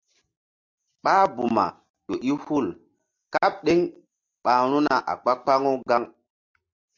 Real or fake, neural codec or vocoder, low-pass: real; none; 7.2 kHz